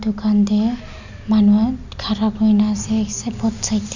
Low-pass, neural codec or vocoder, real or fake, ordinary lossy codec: 7.2 kHz; none; real; none